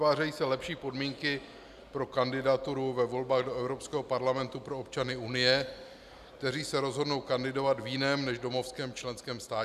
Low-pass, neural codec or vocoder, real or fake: 14.4 kHz; none; real